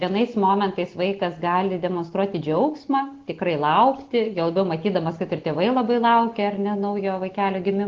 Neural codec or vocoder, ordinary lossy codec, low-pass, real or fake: none; Opus, 16 kbps; 7.2 kHz; real